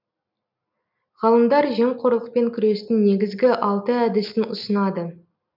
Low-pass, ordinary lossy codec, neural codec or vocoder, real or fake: 5.4 kHz; none; none; real